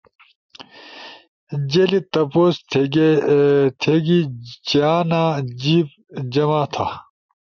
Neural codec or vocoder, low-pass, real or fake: none; 7.2 kHz; real